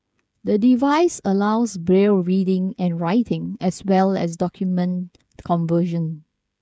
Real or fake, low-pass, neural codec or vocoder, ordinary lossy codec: fake; none; codec, 16 kHz, 16 kbps, FreqCodec, smaller model; none